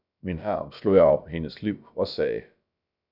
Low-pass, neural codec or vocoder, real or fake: 5.4 kHz; codec, 16 kHz, about 1 kbps, DyCAST, with the encoder's durations; fake